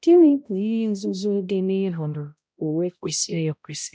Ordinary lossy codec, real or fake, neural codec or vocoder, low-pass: none; fake; codec, 16 kHz, 0.5 kbps, X-Codec, HuBERT features, trained on balanced general audio; none